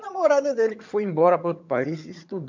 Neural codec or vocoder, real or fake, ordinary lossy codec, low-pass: vocoder, 22.05 kHz, 80 mel bands, HiFi-GAN; fake; MP3, 64 kbps; 7.2 kHz